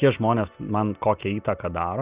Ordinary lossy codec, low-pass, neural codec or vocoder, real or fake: Opus, 64 kbps; 3.6 kHz; none; real